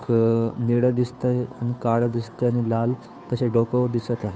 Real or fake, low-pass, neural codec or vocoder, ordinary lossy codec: fake; none; codec, 16 kHz, 2 kbps, FunCodec, trained on Chinese and English, 25 frames a second; none